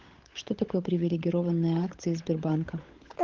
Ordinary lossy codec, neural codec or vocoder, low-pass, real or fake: Opus, 16 kbps; codec, 16 kHz, 16 kbps, FunCodec, trained on LibriTTS, 50 frames a second; 7.2 kHz; fake